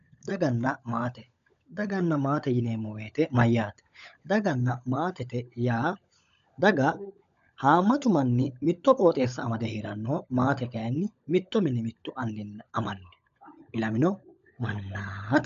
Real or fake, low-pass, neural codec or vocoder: fake; 7.2 kHz; codec, 16 kHz, 16 kbps, FunCodec, trained on LibriTTS, 50 frames a second